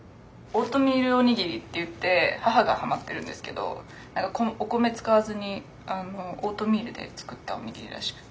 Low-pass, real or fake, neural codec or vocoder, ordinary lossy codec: none; real; none; none